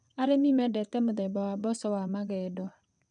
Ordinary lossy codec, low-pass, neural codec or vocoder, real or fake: none; 9.9 kHz; vocoder, 22.05 kHz, 80 mel bands, WaveNeXt; fake